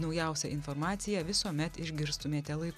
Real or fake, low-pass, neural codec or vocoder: real; 14.4 kHz; none